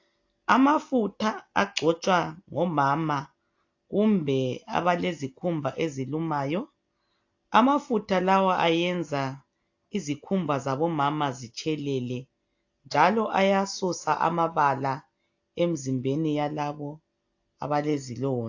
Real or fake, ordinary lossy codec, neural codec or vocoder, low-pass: real; AAC, 48 kbps; none; 7.2 kHz